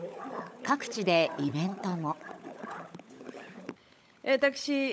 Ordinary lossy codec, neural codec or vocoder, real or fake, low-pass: none; codec, 16 kHz, 16 kbps, FunCodec, trained on LibriTTS, 50 frames a second; fake; none